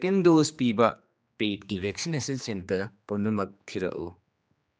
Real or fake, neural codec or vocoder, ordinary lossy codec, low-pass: fake; codec, 16 kHz, 2 kbps, X-Codec, HuBERT features, trained on general audio; none; none